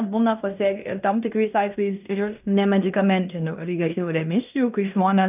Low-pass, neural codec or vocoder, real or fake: 3.6 kHz; codec, 16 kHz in and 24 kHz out, 0.9 kbps, LongCat-Audio-Codec, fine tuned four codebook decoder; fake